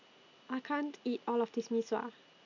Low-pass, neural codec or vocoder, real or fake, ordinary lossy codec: 7.2 kHz; none; real; none